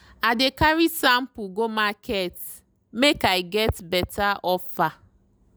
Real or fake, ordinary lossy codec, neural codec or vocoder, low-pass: real; none; none; none